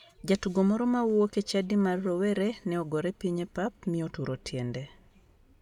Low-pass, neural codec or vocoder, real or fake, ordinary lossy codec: 19.8 kHz; none; real; none